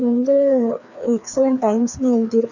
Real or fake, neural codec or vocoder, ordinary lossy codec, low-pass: fake; codec, 16 kHz, 2 kbps, FreqCodec, larger model; none; 7.2 kHz